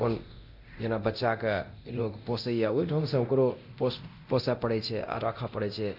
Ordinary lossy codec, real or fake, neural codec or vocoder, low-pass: none; fake; codec, 24 kHz, 0.9 kbps, DualCodec; 5.4 kHz